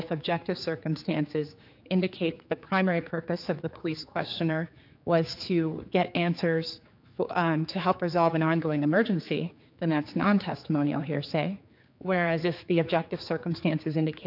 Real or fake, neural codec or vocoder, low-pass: fake; codec, 16 kHz, 4 kbps, X-Codec, HuBERT features, trained on general audio; 5.4 kHz